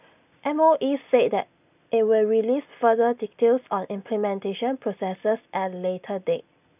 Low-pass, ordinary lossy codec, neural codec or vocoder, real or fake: 3.6 kHz; none; none; real